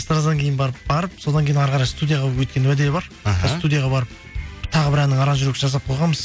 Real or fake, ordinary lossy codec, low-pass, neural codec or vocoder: real; none; none; none